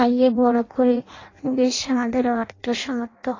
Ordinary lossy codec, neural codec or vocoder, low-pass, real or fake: AAC, 32 kbps; codec, 16 kHz in and 24 kHz out, 0.6 kbps, FireRedTTS-2 codec; 7.2 kHz; fake